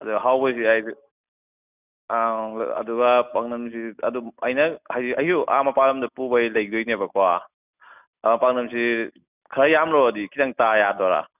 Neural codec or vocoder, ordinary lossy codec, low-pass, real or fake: none; none; 3.6 kHz; real